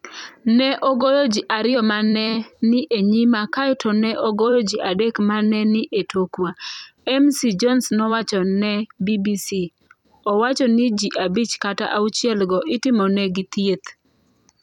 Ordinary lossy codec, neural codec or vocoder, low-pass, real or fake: none; vocoder, 44.1 kHz, 128 mel bands every 256 samples, BigVGAN v2; 19.8 kHz; fake